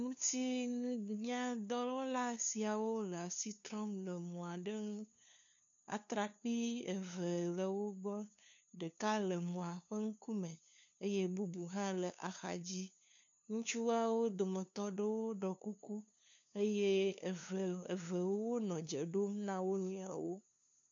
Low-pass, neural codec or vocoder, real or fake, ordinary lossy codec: 7.2 kHz; codec, 16 kHz, 2 kbps, FunCodec, trained on LibriTTS, 25 frames a second; fake; MP3, 96 kbps